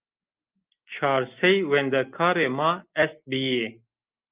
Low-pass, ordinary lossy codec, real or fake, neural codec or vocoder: 3.6 kHz; Opus, 24 kbps; real; none